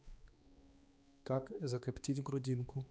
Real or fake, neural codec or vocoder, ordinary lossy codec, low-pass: fake; codec, 16 kHz, 2 kbps, X-Codec, HuBERT features, trained on balanced general audio; none; none